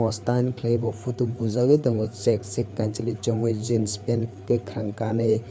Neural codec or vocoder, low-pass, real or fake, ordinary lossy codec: codec, 16 kHz, 4 kbps, FreqCodec, larger model; none; fake; none